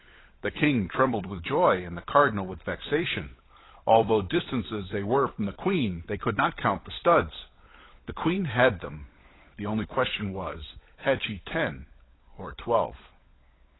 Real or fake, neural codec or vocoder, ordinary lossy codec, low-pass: fake; codec, 16 kHz, 16 kbps, FunCodec, trained on LibriTTS, 50 frames a second; AAC, 16 kbps; 7.2 kHz